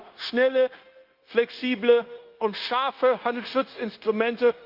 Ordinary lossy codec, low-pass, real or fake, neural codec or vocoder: Opus, 32 kbps; 5.4 kHz; fake; codec, 16 kHz, 0.9 kbps, LongCat-Audio-Codec